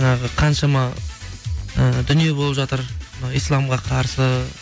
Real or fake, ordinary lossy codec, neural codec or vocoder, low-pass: real; none; none; none